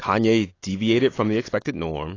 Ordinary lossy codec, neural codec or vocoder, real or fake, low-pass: AAC, 32 kbps; none; real; 7.2 kHz